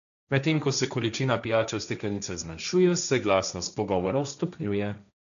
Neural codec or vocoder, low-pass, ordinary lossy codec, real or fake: codec, 16 kHz, 1.1 kbps, Voila-Tokenizer; 7.2 kHz; none; fake